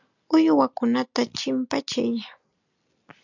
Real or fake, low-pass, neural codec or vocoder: real; 7.2 kHz; none